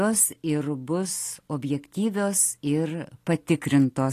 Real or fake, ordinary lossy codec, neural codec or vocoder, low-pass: real; AAC, 48 kbps; none; 14.4 kHz